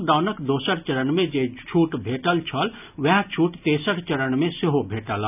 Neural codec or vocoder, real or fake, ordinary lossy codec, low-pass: none; real; none; 3.6 kHz